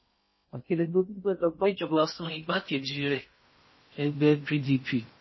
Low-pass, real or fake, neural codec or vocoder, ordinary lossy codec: 7.2 kHz; fake; codec, 16 kHz in and 24 kHz out, 0.6 kbps, FocalCodec, streaming, 4096 codes; MP3, 24 kbps